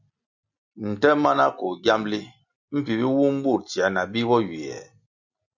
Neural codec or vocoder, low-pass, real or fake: none; 7.2 kHz; real